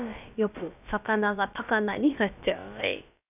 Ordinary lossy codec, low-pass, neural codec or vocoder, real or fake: none; 3.6 kHz; codec, 16 kHz, about 1 kbps, DyCAST, with the encoder's durations; fake